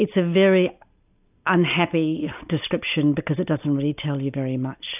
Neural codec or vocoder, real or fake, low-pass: none; real; 3.6 kHz